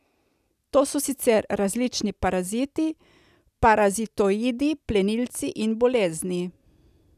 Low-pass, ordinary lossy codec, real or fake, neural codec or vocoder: 14.4 kHz; none; real; none